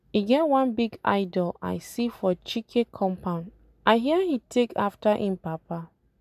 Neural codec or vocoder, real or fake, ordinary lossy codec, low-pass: none; real; none; 14.4 kHz